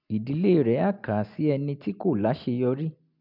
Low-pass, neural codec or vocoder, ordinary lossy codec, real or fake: 5.4 kHz; none; MP3, 48 kbps; real